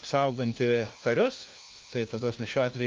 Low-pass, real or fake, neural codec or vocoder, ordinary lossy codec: 7.2 kHz; fake; codec, 16 kHz, 1 kbps, FunCodec, trained on LibriTTS, 50 frames a second; Opus, 24 kbps